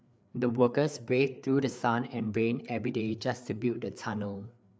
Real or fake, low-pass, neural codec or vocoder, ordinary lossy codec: fake; none; codec, 16 kHz, 4 kbps, FreqCodec, larger model; none